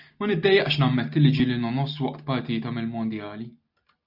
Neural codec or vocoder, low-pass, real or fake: none; 5.4 kHz; real